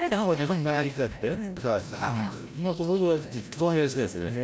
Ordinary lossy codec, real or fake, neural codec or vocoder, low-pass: none; fake; codec, 16 kHz, 0.5 kbps, FreqCodec, larger model; none